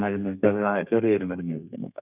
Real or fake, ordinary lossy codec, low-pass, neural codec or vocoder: fake; none; 3.6 kHz; codec, 32 kHz, 1.9 kbps, SNAC